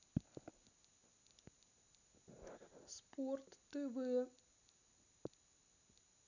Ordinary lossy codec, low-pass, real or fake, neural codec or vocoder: none; 7.2 kHz; real; none